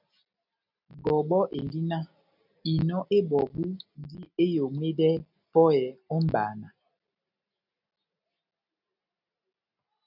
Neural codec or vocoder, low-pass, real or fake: none; 5.4 kHz; real